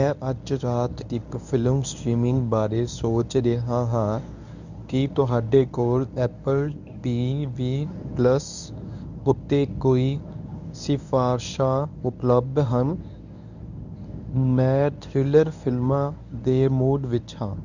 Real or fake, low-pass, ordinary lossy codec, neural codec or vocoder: fake; 7.2 kHz; none; codec, 24 kHz, 0.9 kbps, WavTokenizer, medium speech release version 1